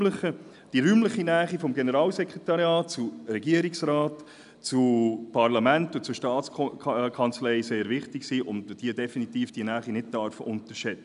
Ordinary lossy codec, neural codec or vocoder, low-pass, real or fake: none; none; 10.8 kHz; real